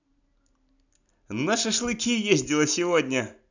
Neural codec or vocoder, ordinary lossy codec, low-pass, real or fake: none; none; 7.2 kHz; real